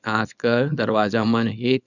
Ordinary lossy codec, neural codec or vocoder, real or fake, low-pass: none; codec, 24 kHz, 0.9 kbps, WavTokenizer, small release; fake; 7.2 kHz